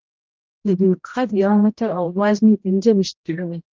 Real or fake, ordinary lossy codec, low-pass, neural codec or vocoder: fake; Opus, 32 kbps; 7.2 kHz; codec, 16 kHz, 0.5 kbps, X-Codec, HuBERT features, trained on general audio